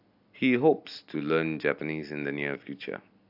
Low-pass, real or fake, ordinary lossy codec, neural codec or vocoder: 5.4 kHz; real; AAC, 48 kbps; none